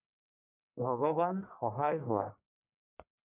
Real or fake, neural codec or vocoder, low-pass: fake; codec, 44.1 kHz, 1.7 kbps, Pupu-Codec; 3.6 kHz